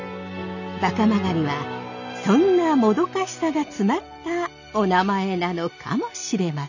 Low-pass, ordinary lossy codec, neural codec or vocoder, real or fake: 7.2 kHz; none; none; real